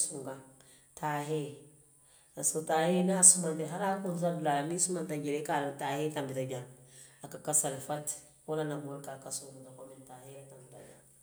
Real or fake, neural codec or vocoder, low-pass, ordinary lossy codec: real; none; none; none